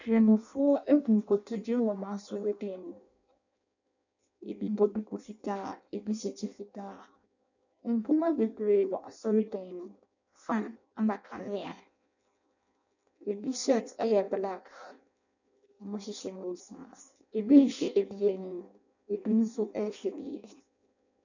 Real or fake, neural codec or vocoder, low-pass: fake; codec, 16 kHz in and 24 kHz out, 0.6 kbps, FireRedTTS-2 codec; 7.2 kHz